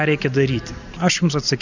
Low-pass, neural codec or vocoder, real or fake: 7.2 kHz; none; real